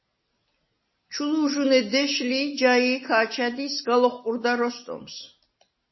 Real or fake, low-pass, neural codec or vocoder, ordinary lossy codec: real; 7.2 kHz; none; MP3, 24 kbps